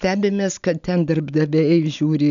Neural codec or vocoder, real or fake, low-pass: none; real; 7.2 kHz